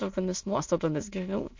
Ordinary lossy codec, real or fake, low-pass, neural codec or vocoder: MP3, 48 kbps; fake; 7.2 kHz; autoencoder, 22.05 kHz, a latent of 192 numbers a frame, VITS, trained on many speakers